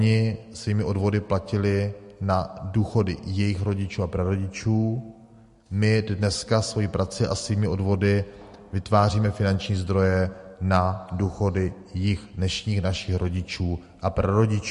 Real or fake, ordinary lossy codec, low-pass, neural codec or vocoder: real; MP3, 48 kbps; 14.4 kHz; none